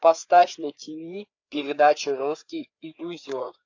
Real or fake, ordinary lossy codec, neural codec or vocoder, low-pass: fake; AAC, 48 kbps; codec, 16 kHz, 4 kbps, FreqCodec, larger model; 7.2 kHz